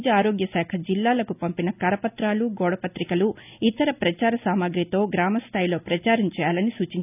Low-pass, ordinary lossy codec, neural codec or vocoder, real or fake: 3.6 kHz; none; none; real